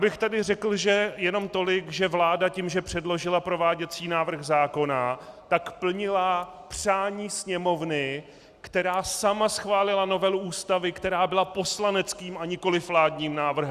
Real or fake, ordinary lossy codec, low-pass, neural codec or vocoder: real; Opus, 64 kbps; 14.4 kHz; none